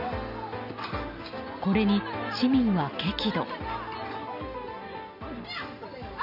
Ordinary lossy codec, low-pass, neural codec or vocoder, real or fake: none; 5.4 kHz; none; real